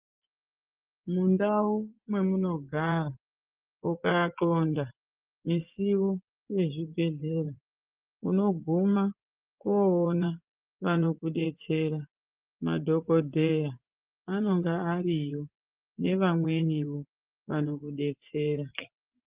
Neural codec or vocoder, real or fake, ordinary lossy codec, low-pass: vocoder, 24 kHz, 100 mel bands, Vocos; fake; Opus, 24 kbps; 3.6 kHz